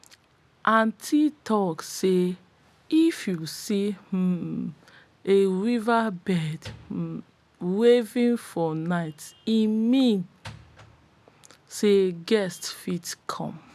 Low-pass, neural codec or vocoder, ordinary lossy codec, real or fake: 14.4 kHz; none; none; real